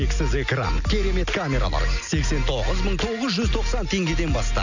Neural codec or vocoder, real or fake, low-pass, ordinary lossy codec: none; real; 7.2 kHz; none